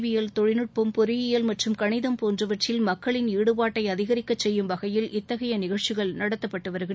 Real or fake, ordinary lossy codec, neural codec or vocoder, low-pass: real; none; none; none